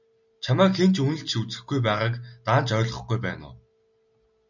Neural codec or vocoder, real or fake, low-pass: none; real; 7.2 kHz